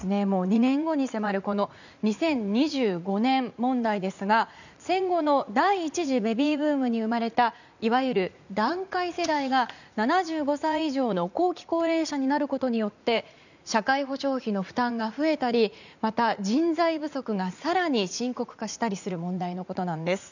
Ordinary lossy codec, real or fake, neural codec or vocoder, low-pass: none; fake; vocoder, 44.1 kHz, 80 mel bands, Vocos; 7.2 kHz